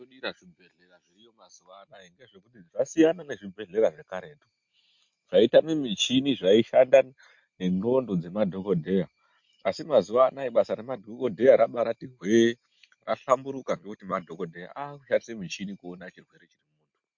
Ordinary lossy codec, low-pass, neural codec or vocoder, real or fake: MP3, 48 kbps; 7.2 kHz; none; real